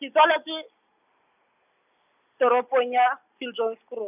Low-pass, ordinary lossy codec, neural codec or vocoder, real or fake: 3.6 kHz; none; none; real